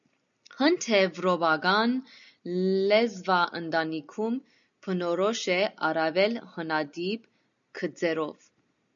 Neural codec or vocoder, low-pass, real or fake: none; 7.2 kHz; real